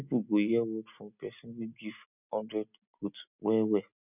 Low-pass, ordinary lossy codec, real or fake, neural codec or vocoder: 3.6 kHz; none; real; none